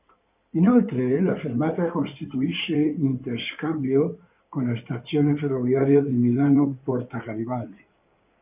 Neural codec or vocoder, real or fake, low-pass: codec, 16 kHz in and 24 kHz out, 2.2 kbps, FireRedTTS-2 codec; fake; 3.6 kHz